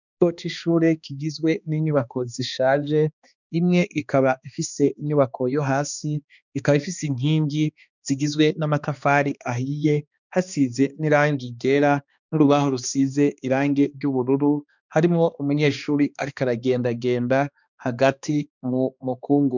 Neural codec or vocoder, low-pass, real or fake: codec, 16 kHz, 2 kbps, X-Codec, HuBERT features, trained on balanced general audio; 7.2 kHz; fake